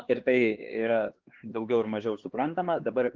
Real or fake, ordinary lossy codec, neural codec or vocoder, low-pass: fake; Opus, 16 kbps; codec, 16 kHz, 2 kbps, X-Codec, HuBERT features, trained on LibriSpeech; 7.2 kHz